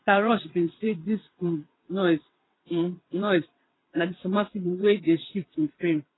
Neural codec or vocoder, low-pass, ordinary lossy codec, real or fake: vocoder, 22.05 kHz, 80 mel bands, WaveNeXt; 7.2 kHz; AAC, 16 kbps; fake